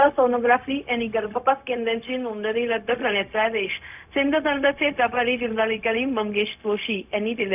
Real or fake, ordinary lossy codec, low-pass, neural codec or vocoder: fake; none; 3.6 kHz; codec, 16 kHz, 0.4 kbps, LongCat-Audio-Codec